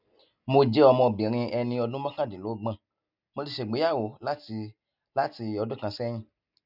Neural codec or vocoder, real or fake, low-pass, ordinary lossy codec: none; real; 5.4 kHz; none